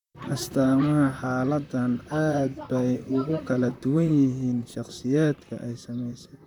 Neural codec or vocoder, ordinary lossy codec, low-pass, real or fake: vocoder, 44.1 kHz, 128 mel bands, Pupu-Vocoder; none; 19.8 kHz; fake